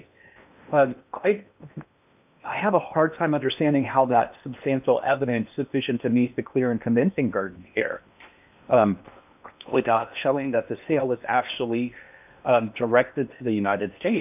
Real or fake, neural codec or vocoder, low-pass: fake; codec, 16 kHz in and 24 kHz out, 0.8 kbps, FocalCodec, streaming, 65536 codes; 3.6 kHz